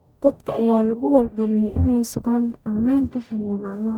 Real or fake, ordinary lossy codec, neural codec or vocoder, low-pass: fake; none; codec, 44.1 kHz, 0.9 kbps, DAC; 19.8 kHz